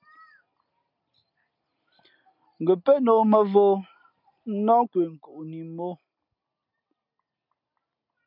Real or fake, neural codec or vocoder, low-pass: real; none; 5.4 kHz